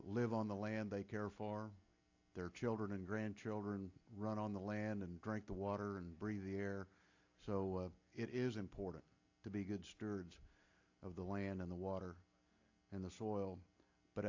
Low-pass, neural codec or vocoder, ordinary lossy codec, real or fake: 7.2 kHz; none; AAC, 48 kbps; real